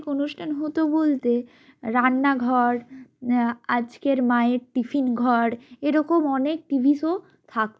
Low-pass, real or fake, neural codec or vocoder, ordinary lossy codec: none; real; none; none